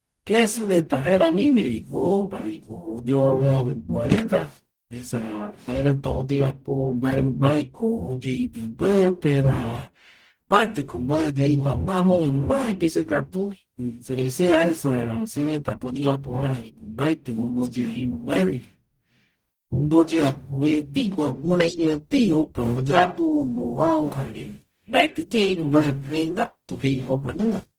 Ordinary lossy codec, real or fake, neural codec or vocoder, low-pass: Opus, 24 kbps; fake; codec, 44.1 kHz, 0.9 kbps, DAC; 19.8 kHz